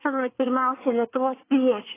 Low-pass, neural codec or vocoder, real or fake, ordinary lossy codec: 3.6 kHz; codec, 24 kHz, 1 kbps, SNAC; fake; AAC, 16 kbps